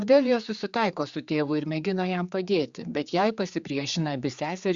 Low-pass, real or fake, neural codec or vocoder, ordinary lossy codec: 7.2 kHz; fake; codec, 16 kHz, 4 kbps, X-Codec, HuBERT features, trained on general audio; Opus, 64 kbps